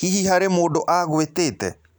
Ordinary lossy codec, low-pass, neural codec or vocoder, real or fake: none; none; none; real